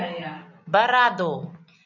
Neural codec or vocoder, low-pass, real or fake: none; 7.2 kHz; real